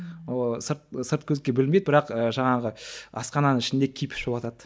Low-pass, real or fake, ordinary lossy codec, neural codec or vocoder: none; real; none; none